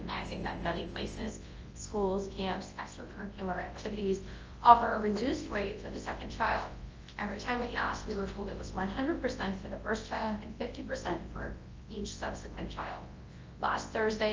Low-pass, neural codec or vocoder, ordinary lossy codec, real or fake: 7.2 kHz; codec, 24 kHz, 0.9 kbps, WavTokenizer, large speech release; Opus, 24 kbps; fake